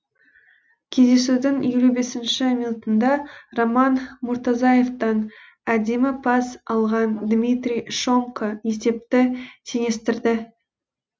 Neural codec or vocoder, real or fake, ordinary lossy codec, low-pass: none; real; none; none